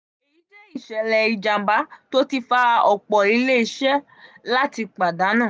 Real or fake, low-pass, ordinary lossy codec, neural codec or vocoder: real; none; none; none